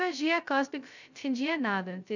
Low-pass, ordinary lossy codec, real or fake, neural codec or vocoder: 7.2 kHz; none; fake; codec, 16 kHz, 0.2 kbps, FocalCodec